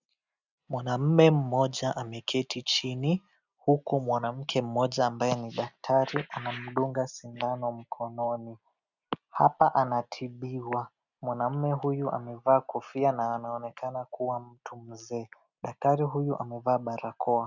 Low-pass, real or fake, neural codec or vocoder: 7.2 kHz; real; none